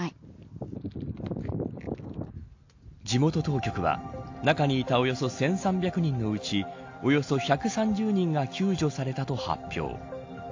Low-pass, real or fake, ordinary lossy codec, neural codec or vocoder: 7.2 kHz; real; AAC, 48 kbps; none